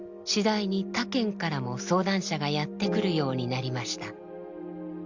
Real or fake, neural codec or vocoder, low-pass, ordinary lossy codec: real; none; 7.2 kHz; Opus, 64 kbps